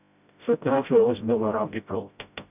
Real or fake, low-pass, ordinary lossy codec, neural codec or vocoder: fake; 3.6 kHz; none; codec, 16 kHz, 0.5 kbps, FreqCodec, smaller model